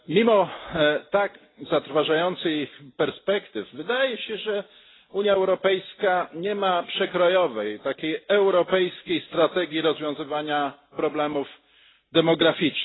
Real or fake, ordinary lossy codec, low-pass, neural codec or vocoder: real; AAC, 16 kbps; 7.2 kHz; none